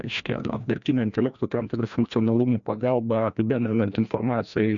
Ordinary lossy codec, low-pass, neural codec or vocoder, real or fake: MP3, 64 kbps; 7.2 kHz; codec, 16 kHz, 1 kbps, FreqCodec, larger model; fake